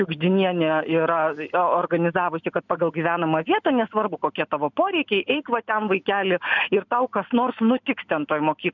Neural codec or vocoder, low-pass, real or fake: none; 7.2 kHz; real